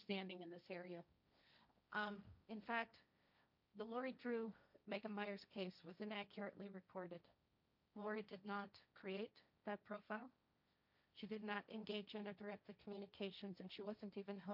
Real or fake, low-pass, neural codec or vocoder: fake; 5.4 kHz; codec, 16 kHz, 1.1 kbps, Voila-Tokenizer